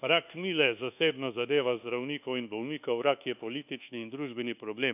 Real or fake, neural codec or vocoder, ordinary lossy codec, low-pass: fake; codec, 24 kHz, 1.2 kbps, DualCodec; none; 3.6 kHz